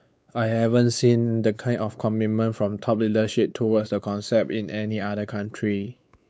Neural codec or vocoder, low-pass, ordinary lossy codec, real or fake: codec, 16 kHz, 4 kbps, X-Codec, WavLM features, trained on Multilingual LibriSpeech; none; none; fake